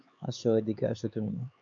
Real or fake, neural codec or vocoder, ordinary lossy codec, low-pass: fake; codec, 16 kHz, 4 kbps, X-Codec, HuBERT features, trained on LibriSpeech; MP3, 96 kbps; 7.2 kHz